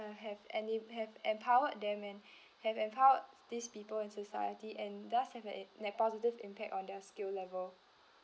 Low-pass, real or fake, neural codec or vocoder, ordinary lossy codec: none; real; none; none